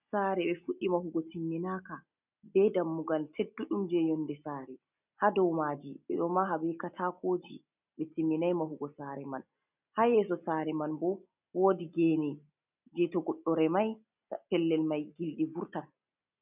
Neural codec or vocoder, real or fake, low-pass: none; real; 3.6 kHz